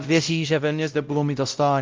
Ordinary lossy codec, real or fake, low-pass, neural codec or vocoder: Opus, 24 kbps; fake; 7.2 kHz; codec, 16 kHz, 0.5 kbps, X-Codec, WavLM features, trained on Multilingual LibriSpeech